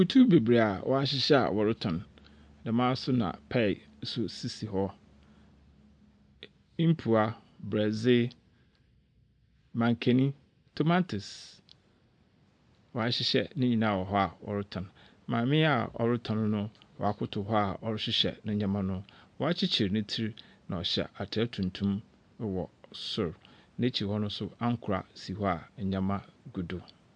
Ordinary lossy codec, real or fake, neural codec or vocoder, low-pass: MP3, 64 kbps; real; none; 9.9 kHz